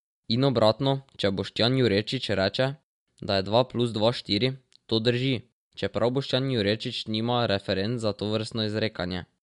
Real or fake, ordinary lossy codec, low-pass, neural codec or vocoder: real; MP3, 64 kbps; 10.8 kHz; none